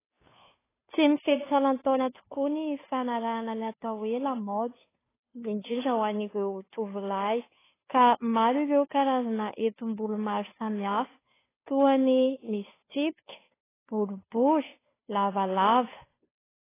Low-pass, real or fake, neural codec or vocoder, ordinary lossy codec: 3.6 kHz; fake; codec, 16 kHz, 2 kbps, FunCodec, trained on Chinese and English, 25 frames a second; AAC, 16 kbps